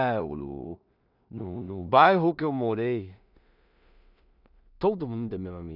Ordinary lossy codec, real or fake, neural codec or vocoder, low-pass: none; fake; codec, 16 kHz in and 24 kHz out, 0.4 kbps, LongCat-Audio-Codec, two codebook decoder; 5.4 kHz